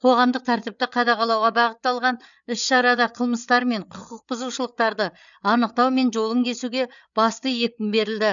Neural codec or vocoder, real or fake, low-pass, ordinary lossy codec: codec, 16 kHz, 8 kbps, FreqCodec, larger model; fake; 7.2 kHz; none